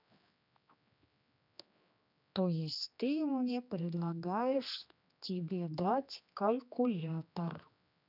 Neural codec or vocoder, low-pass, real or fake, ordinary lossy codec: codec, 16 kHz, 2 kbps, X-Codec, HuBERT features, trained on general audio; 5.4 kHz; fake; none